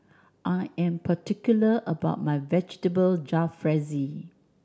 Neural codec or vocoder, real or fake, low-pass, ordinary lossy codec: none; real; none; none